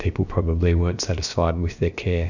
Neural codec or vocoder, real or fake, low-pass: codec, 16 kHz, about 1 kbps, DyCAST, with the encoder's durations; fake; 7.2 kHz